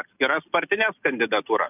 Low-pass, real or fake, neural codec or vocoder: 3.6 kHz; real; none